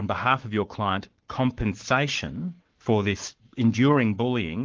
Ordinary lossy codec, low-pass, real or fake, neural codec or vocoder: Opus, 32 kbps; 7.2 kHz; fake; codec, 44.1 kHz, 7.8 kbps, Pupu-Codec